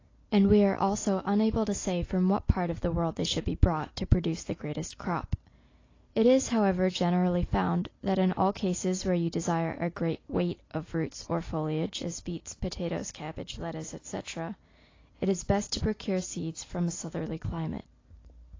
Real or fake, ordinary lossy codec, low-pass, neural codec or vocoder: real; AAC, 32 kbps; 7.2 kHz; none